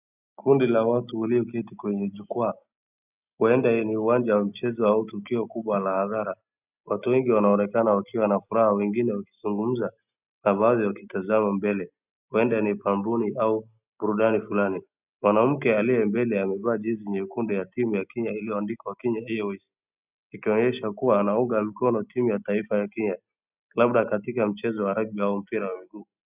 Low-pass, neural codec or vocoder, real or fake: 3.6 kHz; none; real